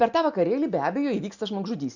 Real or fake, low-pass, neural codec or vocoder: real; 7.2 kHz; none